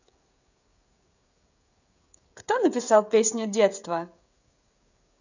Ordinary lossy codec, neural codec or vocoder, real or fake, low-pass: none; codec, 16 kHz, 16 kbps, FreqCodec, smaller model; fake; 7.2 kHz